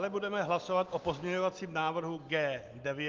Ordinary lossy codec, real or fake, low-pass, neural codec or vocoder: Opus, 32 kbps; real; 7.2 kHz; none